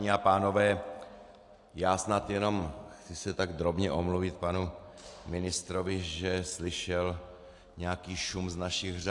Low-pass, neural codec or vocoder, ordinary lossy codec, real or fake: 10.8 kHz; none; AAC, 64 kbps; real